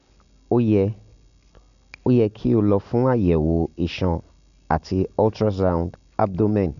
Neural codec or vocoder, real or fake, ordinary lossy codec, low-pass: none; real; MP3, 96 kbps; 7.2 kHz